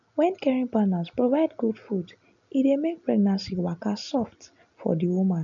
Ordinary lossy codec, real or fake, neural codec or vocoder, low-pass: none; real; none; 7.2 kHz